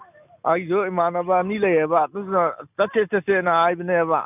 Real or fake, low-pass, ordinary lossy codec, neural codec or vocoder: real; 3.6 kHz; none; none